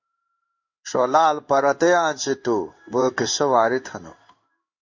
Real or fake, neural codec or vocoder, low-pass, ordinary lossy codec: fake; codec, 16 kHz in and 24 kHz out, 1 kbps, XY-Tokenizer; 7.2 kHz; MP3, 64 kbps